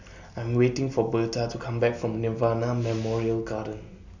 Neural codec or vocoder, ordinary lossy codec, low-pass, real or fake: none; none; 7.2 kHz; real